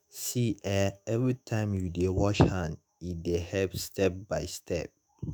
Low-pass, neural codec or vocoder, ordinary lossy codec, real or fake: none; autoencoder, 48 kHz, 128 numbers a frame, DAC-VAE, trained on Japanese speech; none; fake